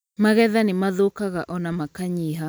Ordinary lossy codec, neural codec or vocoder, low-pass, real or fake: none; none; none; real